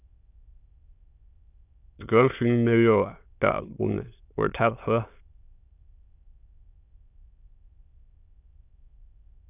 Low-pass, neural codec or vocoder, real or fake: 3.6 kHz; autoencoder, 22.05 kHz, a latent of 192 numbers a frame, VITS, trained on many speakers; fake